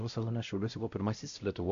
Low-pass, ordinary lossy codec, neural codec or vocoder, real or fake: 7.2 kHz; AAC, 64 kbps; codec, 16 kHz, 0.5 kbps, X-Codec, WavLM features, trained on Multilingual LibriSpeech; fake